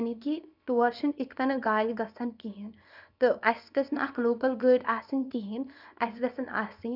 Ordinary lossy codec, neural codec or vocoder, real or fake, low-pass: none; codec, 24 kHz, 0.9 kbps, WavTokenizer, small release; fake; 5.4 kHz